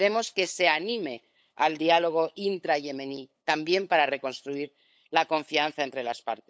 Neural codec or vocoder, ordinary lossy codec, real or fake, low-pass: codec, 16 kHz, 8 kbps, FunCodec, trained on LibriTTS, 25 frames a second; none; fake; none